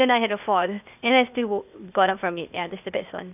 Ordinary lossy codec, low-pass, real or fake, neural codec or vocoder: none; 3.6 kHz; fake; codec, 16 kHz, 0.8 kbps, ZipCodec